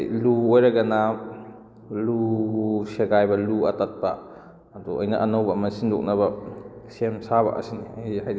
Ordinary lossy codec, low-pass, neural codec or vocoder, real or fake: none; none; none; real